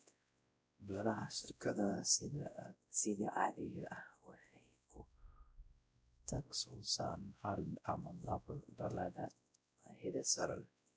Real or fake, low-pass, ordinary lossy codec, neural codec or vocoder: fake; none; none; codec, 16 kHz, 0.5 kbps, X-Codec, WavLM features, trained on Multilingual LibriSpeech